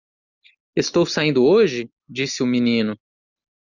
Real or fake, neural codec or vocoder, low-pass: real; none; 7.2 kHz